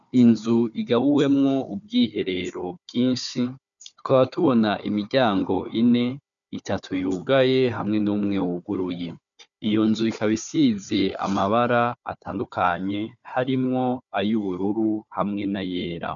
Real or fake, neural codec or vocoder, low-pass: fake; codec, 16 kHz, 4 kbps, FunCodec, trained on Chinese and English, 50 frames a second; 7.2 kHz